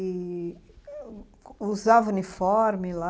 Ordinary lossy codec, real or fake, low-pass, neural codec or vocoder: none; real; none; none